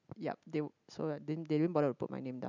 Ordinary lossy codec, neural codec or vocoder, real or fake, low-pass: none; none; real; 7.2 kHz